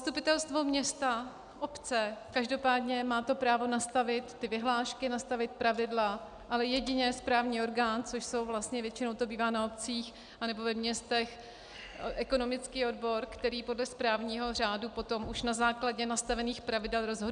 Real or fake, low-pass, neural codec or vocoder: real; 9.9 kHz; none